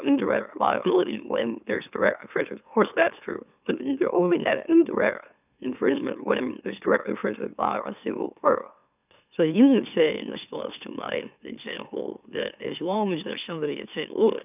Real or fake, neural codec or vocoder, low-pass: fake; autoencoder, 44.1 kHz, a latent of 192 numbers a frame, MeloTTS; 3.6 kHz